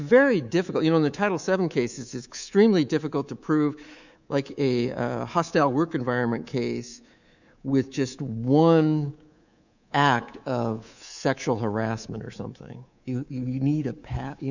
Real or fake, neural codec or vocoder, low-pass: fake; codec, 24 kHz, 3.1 kbps, DualCodec; 7.2 kHz